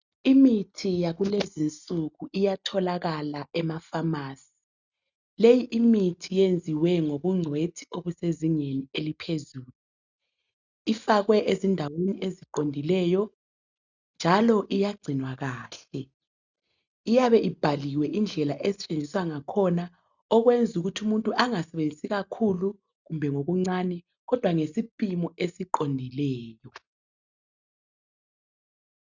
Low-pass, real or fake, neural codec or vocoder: 7.2 kHz; real; none